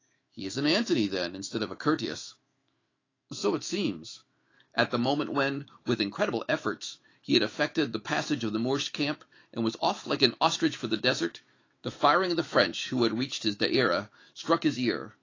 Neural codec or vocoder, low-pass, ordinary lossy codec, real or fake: autoencoder, 48 kHz, 128 numbers a frame, DAC-VAE, trained on Japanese speech; 7.2 kHz; AAC, 32 kbps; fake